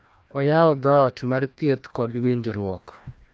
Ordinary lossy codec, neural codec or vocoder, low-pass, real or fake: none; codec, 16 kHz, 1 kbps, FreqCodec, larger model; none; fake